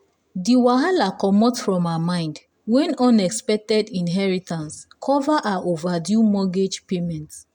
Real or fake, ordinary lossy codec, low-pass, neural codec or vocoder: real; none; 19.8 kHz; none